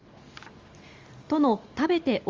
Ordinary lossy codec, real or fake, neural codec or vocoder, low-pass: Opus, 32 kbps; real; none; 7.2 kHz